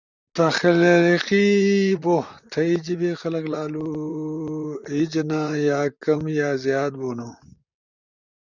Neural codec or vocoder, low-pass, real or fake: vocoder, 44.1 kHz, 128 mel bands, Pupu-Vocoder; 7.2 kHz; fake